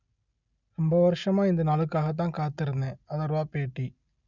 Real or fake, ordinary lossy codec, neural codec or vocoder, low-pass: real; none; none; 7.2 kHz